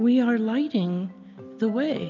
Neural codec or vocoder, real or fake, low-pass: none; real; 7.2 kHz